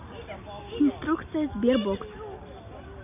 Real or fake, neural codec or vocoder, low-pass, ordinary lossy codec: real; none; 3.6 kHz; none